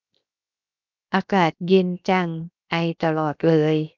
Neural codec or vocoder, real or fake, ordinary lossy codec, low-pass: codec, 16 kHz, 0.7 kbps, FocalCodec; fake; none; 7.2 kHz